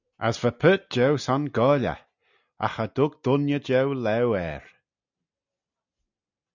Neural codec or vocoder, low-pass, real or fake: none; 7.2 kHz; real